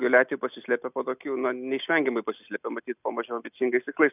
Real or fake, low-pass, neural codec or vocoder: real; 3.6 kHz; none